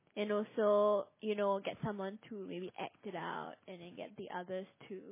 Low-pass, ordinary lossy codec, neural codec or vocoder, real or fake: 3.6 kHz; MP3, 16 kbps; none; real